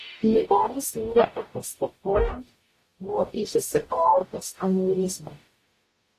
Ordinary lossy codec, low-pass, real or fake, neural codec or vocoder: AAC, 48 kbps; 14.4 kHz; fake; codec, 44.1 kHz, 0.9 kbps, DAC